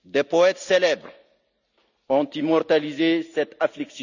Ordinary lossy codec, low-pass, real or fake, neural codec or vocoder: none; 7.2 kHz; real; none